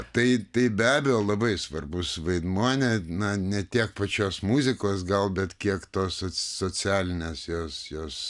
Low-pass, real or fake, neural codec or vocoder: 10.8 kHz; real; none